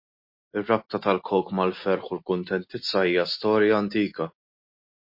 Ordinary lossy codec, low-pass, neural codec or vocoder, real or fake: MP3, 32 kbps; 5.4 kHz; none; real